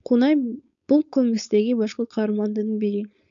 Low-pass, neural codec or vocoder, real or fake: 7.2 kHz; codec, 16 kHz, 4.8 kbps, FACodec; fake